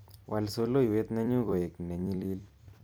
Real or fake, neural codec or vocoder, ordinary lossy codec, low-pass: fake; vocoder, 44.1 kHz, 128 mel bands every 256 samples, BigVGAN v2; none; none